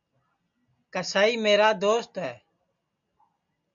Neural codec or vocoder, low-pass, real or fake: none; 7.2 kHz; real